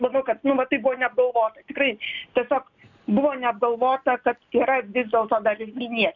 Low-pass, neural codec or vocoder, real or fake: 7.2 kHz; none; real